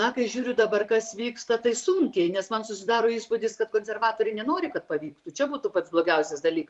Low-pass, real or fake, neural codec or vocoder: 10.8 kHz; fake; vocoder, 44.1 kHz, 128 mel bands every 256 samples, BigVGAN v2